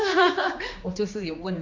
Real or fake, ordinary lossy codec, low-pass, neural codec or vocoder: fake; MP3, 48 kbps; 7.2 kHz; codec, 16 kHz, 2 kbps, X-Codec, HuBERT features, trained on general audio